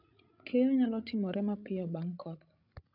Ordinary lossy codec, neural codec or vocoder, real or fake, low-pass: none; codec, 16 kHz, 16 kbps, FreqCodec, larger model; fake; 5.4 kHz